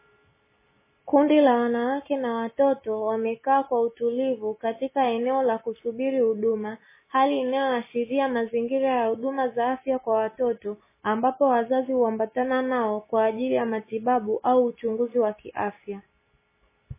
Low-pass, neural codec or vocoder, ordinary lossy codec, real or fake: 3.6 kHz; none; MP3, 16 kbps; real